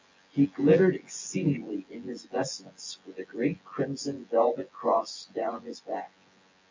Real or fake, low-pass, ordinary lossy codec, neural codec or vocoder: fake; 7.2 kHz; MP3, 64 kbps; vocoder, 24 kHz, 100 mel bands, Vocos